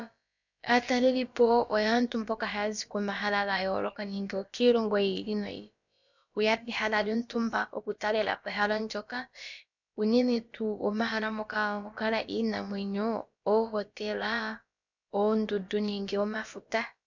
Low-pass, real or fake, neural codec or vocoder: 7.2 kHz; fake; codec, 16 kHz, about 1 kbps, DyCAST, with the encoder's durations